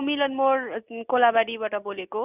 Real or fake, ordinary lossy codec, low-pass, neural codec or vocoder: real; none; 3.6 kHz; none